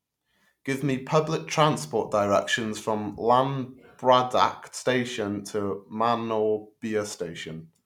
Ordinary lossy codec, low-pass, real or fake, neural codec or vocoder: none; 19.8 kHz; real; none